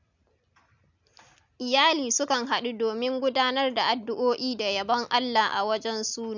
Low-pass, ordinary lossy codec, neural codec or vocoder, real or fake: 7.2 kHz; none; none; real